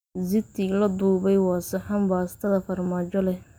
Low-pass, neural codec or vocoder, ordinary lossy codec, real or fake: none; none; none; real